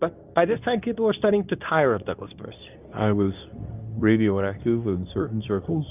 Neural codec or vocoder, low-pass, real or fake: codec, 24 kHz, 0.9 kbps, WavTokenizer, medium speech release version 2; 3.6 kHz; fake